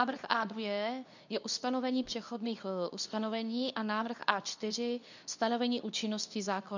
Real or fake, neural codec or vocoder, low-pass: fake; codec, 24 kHz, 0.9 kbps, WavTokenizer, medium speech release version 2; 7.2 kHz